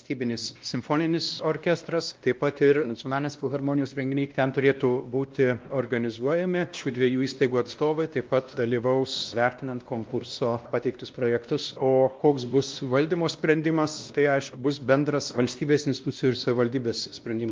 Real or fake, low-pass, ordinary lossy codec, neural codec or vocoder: fake; 7.2 kHz; Opus, 24 kbps; codec, 16 kHz, 1 kbps, X-Codec, WavLM features, trained on Multilingual LibriSpeech